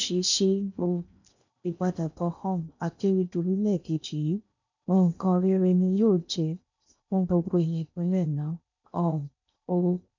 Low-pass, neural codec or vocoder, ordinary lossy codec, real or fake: 7.2 kHz; codec, 16 kHz in and 24 kHz out, 0.6 kbps, FocalCodec, streaming, 4096 codes; none; fake